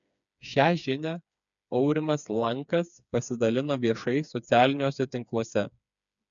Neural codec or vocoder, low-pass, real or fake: codec, 16 kHz, 4 kbps, FreqCodec, smaller model; 7.2 kHz; fake